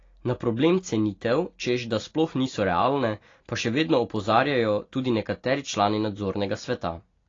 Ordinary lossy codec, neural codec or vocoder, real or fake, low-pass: AAC, 32 kbps; none; real; 7.2 kHz